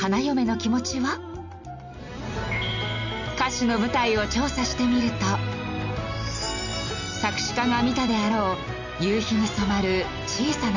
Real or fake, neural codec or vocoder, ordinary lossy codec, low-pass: real; none; none; 7.2 kHz